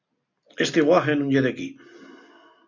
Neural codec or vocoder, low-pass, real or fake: none; 7.2 kHz; real